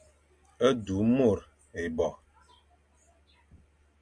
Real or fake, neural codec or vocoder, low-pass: real; none; 9.9 kHz